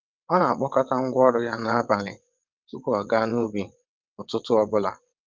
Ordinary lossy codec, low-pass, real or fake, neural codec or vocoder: Opus, 24 kbps; 7.2 kHz; fake; vocoder, 22.05 kHz, 80 mel bands, WaveNeXt